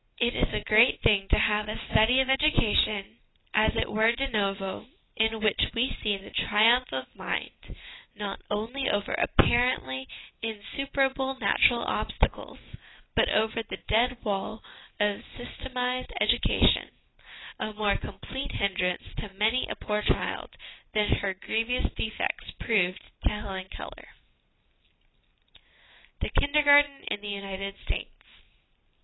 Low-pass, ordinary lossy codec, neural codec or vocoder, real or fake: 7.2 kHz; AAC, 16 kbps; none; real